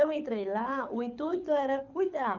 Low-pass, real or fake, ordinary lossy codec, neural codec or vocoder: 7.2 kHz; fake; none; codec, 24 kHz, 6 kbps, HILCodec